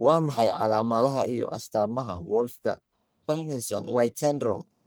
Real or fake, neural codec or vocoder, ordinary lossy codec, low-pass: fake; codec, 44.1 kHz, 1.7 kbps, Pupu-Codec; none; none